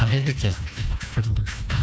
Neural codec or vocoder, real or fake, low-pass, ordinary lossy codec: codec, 16 kHz, 1 kbps, FunCodec, trained on Chinese and English, 50 frames a second; fake; none; none